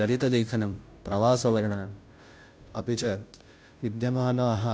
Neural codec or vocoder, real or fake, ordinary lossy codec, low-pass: codec, 16 kHz, 0.5 kbps, FunCodec, trained on Chinese and English, 25 frames a second; fake; none; none